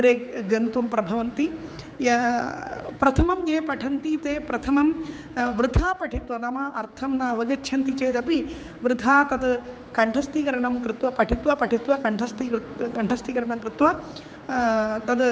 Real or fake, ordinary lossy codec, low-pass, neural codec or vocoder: fake; none; none; codec, 16 kHz, 4 kbps, X-Codec, HuBERT features, trained on general audio